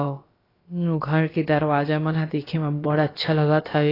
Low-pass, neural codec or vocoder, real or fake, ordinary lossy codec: 5.4 kHz; codec, 16 kHz, about 1 kbps, DyCAST, with the encoder's durations; fake; AAC, 24 kbps